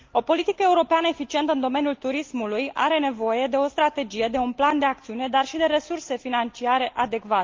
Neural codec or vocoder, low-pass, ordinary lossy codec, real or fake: none; 7.2 kHz; Opus, 32 kbps; real